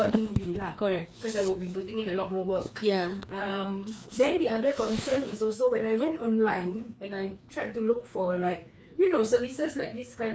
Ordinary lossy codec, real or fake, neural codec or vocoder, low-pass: none; fake; codec, 16 kHz, 2 kbps, FreqCodec, larger model; none